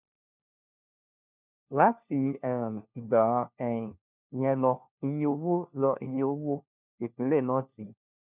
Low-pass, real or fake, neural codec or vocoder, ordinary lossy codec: 3.6 kHz; fake; codec, 16 kHz, 1 kbps, FunCodec, trained on LibriTTS, 50 frames a second; none